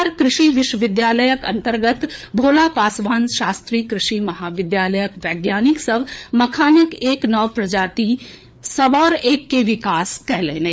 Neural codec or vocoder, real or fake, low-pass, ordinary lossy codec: codec, 16 kHz, 4 kbps, FreqCodec, larger model; fake; none; none